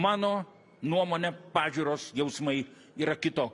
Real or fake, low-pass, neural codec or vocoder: real; 10.8 kHz; none